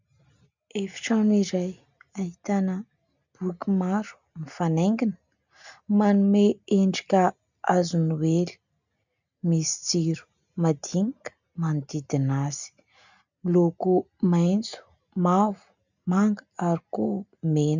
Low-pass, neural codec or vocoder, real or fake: 7.2 kHz; none; real